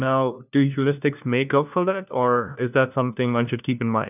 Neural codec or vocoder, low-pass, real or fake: codec, 16 kHz, 1 kbps, FunCodec, trained on LibriTTS, 50 frames a second; 3.6 kHz; fake